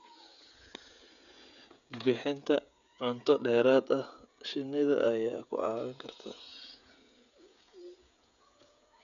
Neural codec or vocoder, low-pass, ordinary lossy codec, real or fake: codec, 16 kHz, 16 kbps, FreqCodec, smaller model; 7.2 kHz; none; fake